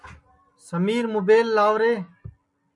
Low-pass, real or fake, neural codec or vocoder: 10.8 kHz; real; none